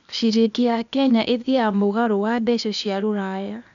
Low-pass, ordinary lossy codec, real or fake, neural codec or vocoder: 7.2 kHz; none; fake; codec, 16 kHz, 0.8 kbps, ZipCodec